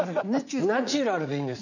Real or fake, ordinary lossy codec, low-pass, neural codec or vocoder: fake; none; 7.2 kHz; vocoder, 44.1 kHz, 80 mel bands, Vocos